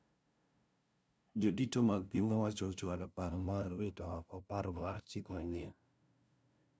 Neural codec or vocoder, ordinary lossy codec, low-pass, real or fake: codec, 16 kHz, 0.5 kbps, FunCodec, trained on LibriTTS, 25 frames a second; none; none; fake